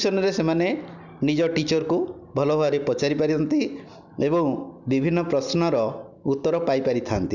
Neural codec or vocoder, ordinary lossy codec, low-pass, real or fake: none; none; 7.2 kHz; real